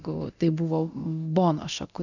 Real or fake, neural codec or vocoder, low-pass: fake; codec, 24 kHz, 0.9 kbps, DualCodec; 7.2 kHz